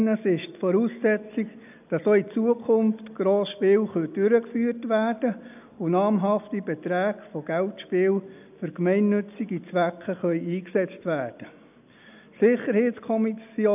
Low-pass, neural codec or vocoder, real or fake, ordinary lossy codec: 3.6 kHz; none; real; none